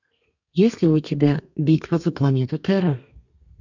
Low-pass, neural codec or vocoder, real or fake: 7.2 kHz; codec, 32 kHz, 1.9 kbps, SNAC; fake